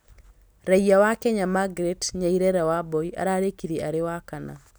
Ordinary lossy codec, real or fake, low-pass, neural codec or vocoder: none; real; none; none